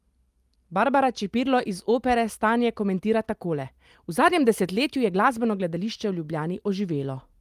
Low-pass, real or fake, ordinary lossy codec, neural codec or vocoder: 14.4 kHz; real; Opus, 32 kbps; none